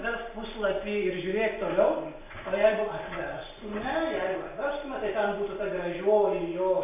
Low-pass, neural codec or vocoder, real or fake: 3.6 kHz; none; real